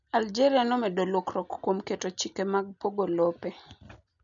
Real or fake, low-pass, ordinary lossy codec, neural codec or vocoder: real; 7.2 kHz; none; none